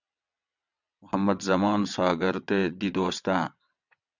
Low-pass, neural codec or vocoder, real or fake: 7.2 kHz; vocoder, 22.05 kHz, 80 mel bands, WaveNeXt; fake